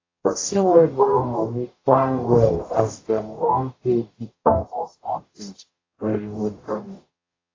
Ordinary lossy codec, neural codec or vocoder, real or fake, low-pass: AAC, 32 kbps; codec, 44.1 kHz, 0.9 kbps, DAC; fake; 7.2 kHz